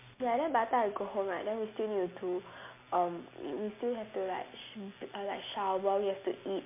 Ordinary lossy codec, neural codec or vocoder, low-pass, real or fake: MP3, 24 kbps; none; 3.6 kHz; real